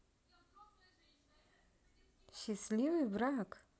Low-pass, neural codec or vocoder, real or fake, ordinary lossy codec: none; none; real; none